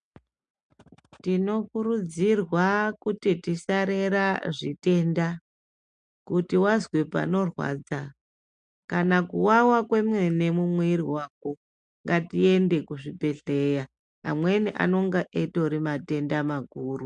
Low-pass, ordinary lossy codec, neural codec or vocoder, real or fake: 9.9 kHz; MP3, 64 kbps; none; real